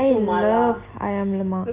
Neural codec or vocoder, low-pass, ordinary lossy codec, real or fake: none; 3.6 kHz; Opus, 32 kbps; real